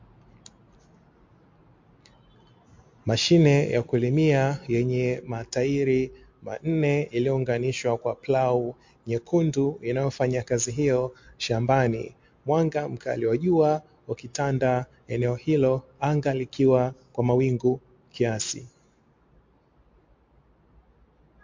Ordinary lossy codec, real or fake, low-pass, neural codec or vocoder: MP3, 48 kbps; real; 7.2 kHz; none